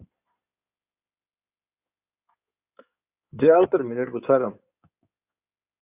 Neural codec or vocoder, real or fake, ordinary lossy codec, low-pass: codec, 16 kHz in and 24 kHz out, 2.2 kbps, FireRedTTS-2 codec; fake; Opus, 64 kbps; 3.6 kHz